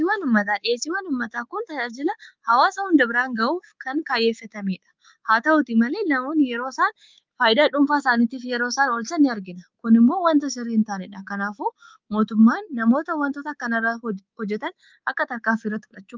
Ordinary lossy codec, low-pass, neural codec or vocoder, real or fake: Opus, 32 kbps; 7.2 kHz; codec, 24 kHz, 3.1 kbps, DualCodec; fake